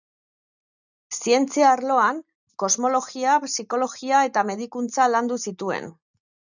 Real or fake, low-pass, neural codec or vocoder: real; 7.2 kHz; none